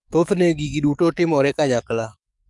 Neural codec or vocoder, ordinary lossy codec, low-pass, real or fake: codec, 44.1 kHz, 7.8 kbps, DAC; none; 10.8 kHz; fake